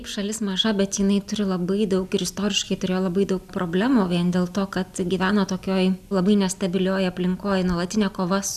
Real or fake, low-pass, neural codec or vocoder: real; 14.4 kHz; none